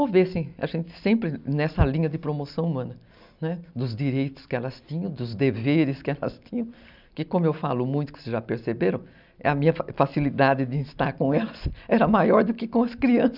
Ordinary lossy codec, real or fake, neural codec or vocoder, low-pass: none; real; none; 5.4 kHz